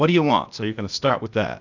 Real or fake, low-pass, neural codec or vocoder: fake; 7.2 kHz; codec, 16 kHz, 0.8 kbps, ZipCodec